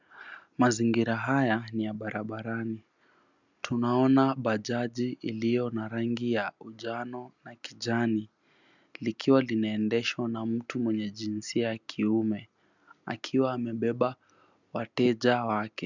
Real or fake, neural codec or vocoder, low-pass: real; none; 7.2 kHz